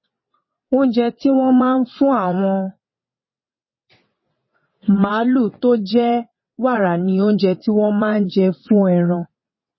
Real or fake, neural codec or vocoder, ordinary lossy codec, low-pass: fake; vocoder, 22.05 kHz, 80 mel bands, WaveNeXt; MP3, 24 kbps; 7.2 kHz